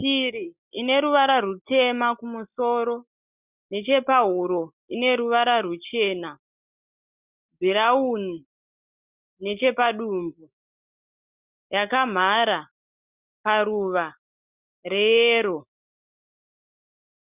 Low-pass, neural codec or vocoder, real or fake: 3.6 kHz; none; real